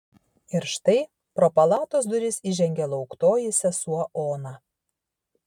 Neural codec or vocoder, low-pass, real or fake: none; 19.8 kHz; real